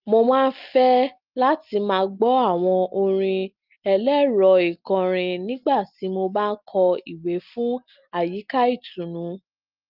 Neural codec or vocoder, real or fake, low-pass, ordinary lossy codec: none; real; 5.4 kHz; Opus, 32 kbps